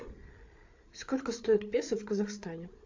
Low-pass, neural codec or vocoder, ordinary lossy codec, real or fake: 7.2 kHz; codec, 16 kHz, 8 kbps, FreqCodec, larger model; AAC, 48 kbps; fake